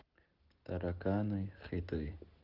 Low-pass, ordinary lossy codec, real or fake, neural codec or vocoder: 5.4 kHz; Opus, 16 kbps; real; none